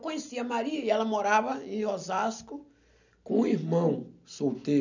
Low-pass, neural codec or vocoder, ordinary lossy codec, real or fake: 7.2 kHz; none; none; real